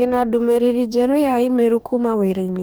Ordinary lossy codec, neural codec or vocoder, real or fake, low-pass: none; codec, 44.1 kHz, 2.6 kbps, DAC; fake; none